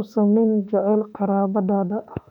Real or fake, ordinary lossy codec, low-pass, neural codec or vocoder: fake; none; 19.8 kHz; autoencoder, 48 kHz, 32 numbers a frame, DAC-VAE, trained on Japanese speech